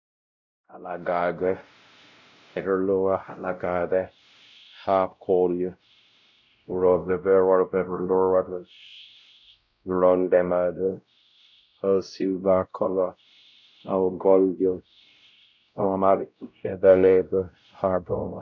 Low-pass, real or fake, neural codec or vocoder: 7.2 kHz; fake; codec, 16 kHz, 0.5 kbps, X-Codec, WavLM features, trained on Multilingual LibriSpeech